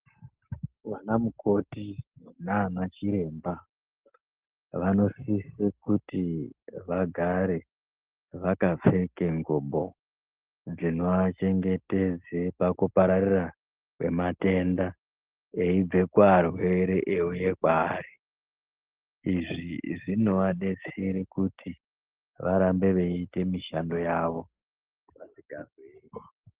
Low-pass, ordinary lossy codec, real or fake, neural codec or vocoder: 3.6 kHz; Opus, 16 kbps; real; none